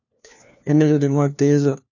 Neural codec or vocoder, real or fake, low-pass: codec, 16 kHz, 1 kbps, FunCodec, trained on LibriTTS, 50 frames a second; fake; 7.2 kHz